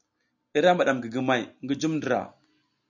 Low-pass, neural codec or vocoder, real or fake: 7.2 kHz; none; real